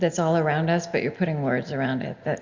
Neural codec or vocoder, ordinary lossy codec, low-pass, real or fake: none; Opus, 64 kbps; 7.2 kHz; real